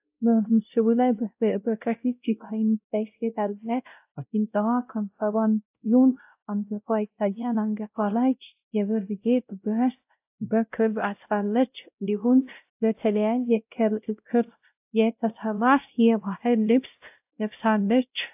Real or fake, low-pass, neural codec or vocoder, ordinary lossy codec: fake; 3.6 kHz; codec, 16 kHz, 0.5 kbps, X-Codec, WavLM features, trained on Multilingual LibriSpeech; AAC, 32 kbps